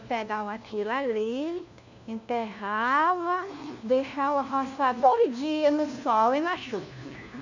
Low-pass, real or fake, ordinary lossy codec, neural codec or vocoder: 7.2 kHz; fake; none; codec, 16 kHz, 1 kbps, FunCodec, trained on LibriTTS, 50 frames a second